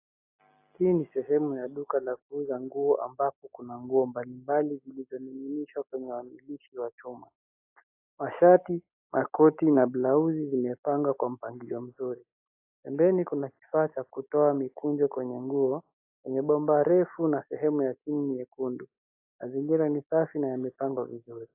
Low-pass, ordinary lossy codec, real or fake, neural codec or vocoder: 3.6 kHz; AAC, 32 kbps; real; none